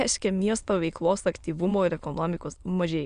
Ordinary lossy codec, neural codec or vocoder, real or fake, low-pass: Opus, 64 kbps; autoencoder, 22.05 kHz, a latent of 192 numbers a frame, VITS, trained on many speakers; fake; 9.9 kHz